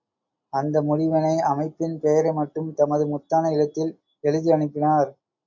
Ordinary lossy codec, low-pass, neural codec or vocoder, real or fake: MP3, 48 kbps; 7.2 kHz; none; real